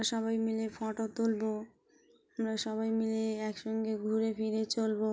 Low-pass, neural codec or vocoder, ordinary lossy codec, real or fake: none; none; none; real